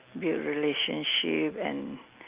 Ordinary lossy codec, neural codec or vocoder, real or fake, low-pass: Opus, 32 kbps; none; real; 3.6 kHz